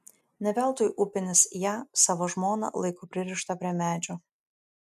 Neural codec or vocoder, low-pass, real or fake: none; 14.4 kHz; real